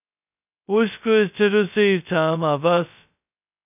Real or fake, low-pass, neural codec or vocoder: fake; 3.6 kHz; codec, 16 kHz, 0.2 kbps, FocalCodec